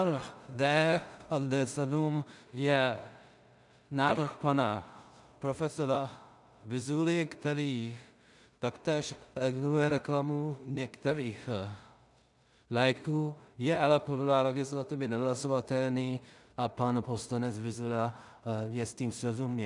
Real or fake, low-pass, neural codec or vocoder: fake; 10.8 kHz; codec, 16 kHz in and 24 kHz out, 0.4 kbps, LongCat-Audio-Codec, two codebook decoder